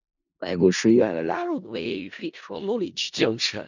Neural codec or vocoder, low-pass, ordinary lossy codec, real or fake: codec, 16 kHz in and 24 kHz out, 0.4 kbps, LongCat-Audio-Codec, four codebook decoder; 7.2 kHz; none; fake